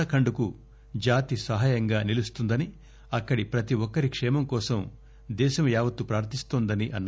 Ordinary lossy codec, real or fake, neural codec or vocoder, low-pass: none; real; none; none